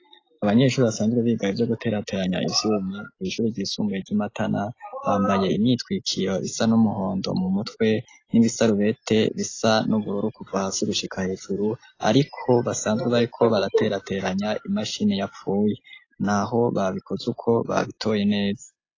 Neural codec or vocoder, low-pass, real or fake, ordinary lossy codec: none; 7.2 kHz; real; AAC, 32 kbps